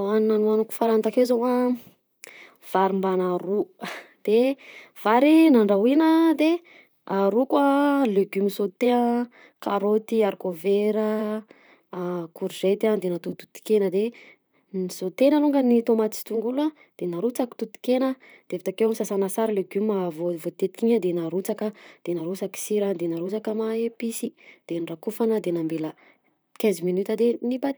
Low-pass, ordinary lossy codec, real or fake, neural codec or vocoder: none; none; fake; vocoder, 44.1 kHz, 128 mel bands, Pupu-Vocoder